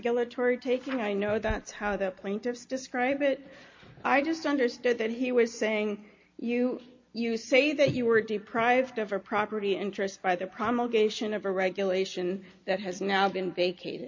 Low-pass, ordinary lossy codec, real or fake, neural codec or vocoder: 7.2 kHz; MP3, 48 kbps; real; none